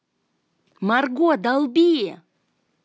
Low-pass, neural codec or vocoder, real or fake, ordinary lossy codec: none; none; real; none